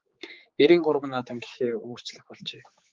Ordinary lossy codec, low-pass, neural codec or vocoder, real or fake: Opus, 24 kbps; 7.2 kHz; codec, 16 kHz, 4 kbps, X-Codec, HuBERT features, trained on general audio; fake